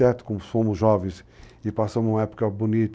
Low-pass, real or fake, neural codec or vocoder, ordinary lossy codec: none; real; none; none